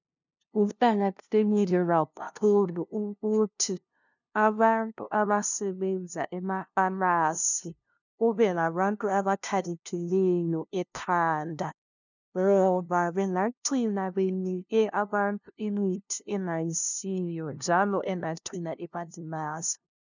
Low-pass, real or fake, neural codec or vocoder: 7.2 kHz; fake; codec, 16 kHz, 0.5 kbps, FunCodec, trained on LibriTTS, 25 frames a second